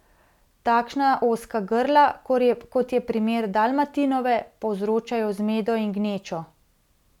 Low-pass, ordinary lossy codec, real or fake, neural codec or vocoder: 19.8 kHz; none; real; none